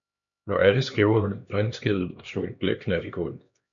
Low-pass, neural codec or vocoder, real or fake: 7.2 kHz; codec, 16 kHz, 2 kbps, X-Codec, HuBERT features, trained on LibriSpeech; fake